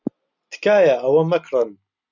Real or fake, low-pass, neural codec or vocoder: real; 7.2 kHz; none